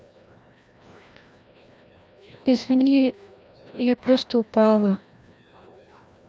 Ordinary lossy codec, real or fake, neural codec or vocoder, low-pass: none; fake; codec, 16 kHz, 1 kbps, FreqCodec, larger model; none